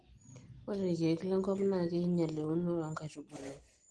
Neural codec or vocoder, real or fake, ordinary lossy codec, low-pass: none; real; Opus, 16 kbps; 9.9 kHz